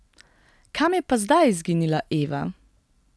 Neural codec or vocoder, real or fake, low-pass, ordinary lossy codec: none; real; none; none